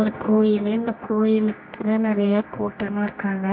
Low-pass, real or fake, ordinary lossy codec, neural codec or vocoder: 5.4 kHz; fake; MP3, 48 kbps; codec, 24 kHz, 0.9 kbps, WavTokenizer, medium music audio release